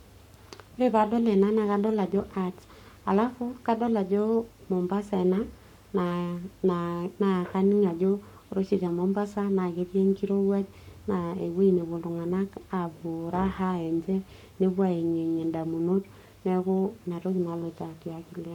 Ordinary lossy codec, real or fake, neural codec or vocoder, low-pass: none; fake; codec, 44.1 kHz, 7.8 kbps, Pupu-Codec; 19.8 kHz